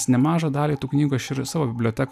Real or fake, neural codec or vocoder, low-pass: real; none; 14.4 kHz